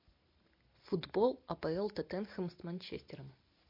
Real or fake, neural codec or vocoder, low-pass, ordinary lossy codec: real; none; 5.4 kHz; MP3, 32 kbps